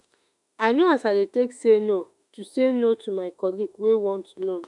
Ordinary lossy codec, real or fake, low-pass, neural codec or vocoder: none; fake; 10.8 kHz; autoencoder, 48 kHz, 32 numbers a frame, DAC-VAE, trained on Japanese speech